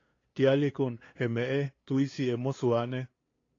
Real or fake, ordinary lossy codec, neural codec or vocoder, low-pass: fake; AAC, 32 kbps; codec, 16 kHz, 2 kbps, FunCodec, trained on LibriTTS, 25 frames a second; 7.2 kHz